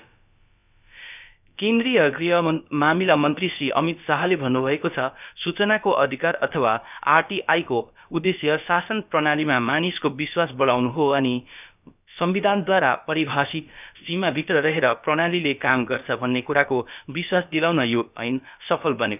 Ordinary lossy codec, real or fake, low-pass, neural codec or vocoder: none; fake; 3.6 kHz; codec, 16 kHz, about 1 kbps, DyCAST, with the encoder's durations